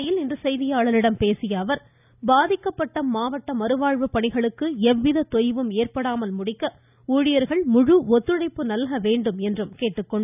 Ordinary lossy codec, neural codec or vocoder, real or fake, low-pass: none; none; real; 3.6 kHz